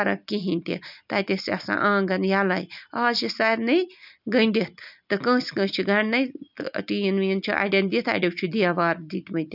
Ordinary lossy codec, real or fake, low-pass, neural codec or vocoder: none; real; 5.4 kHz; none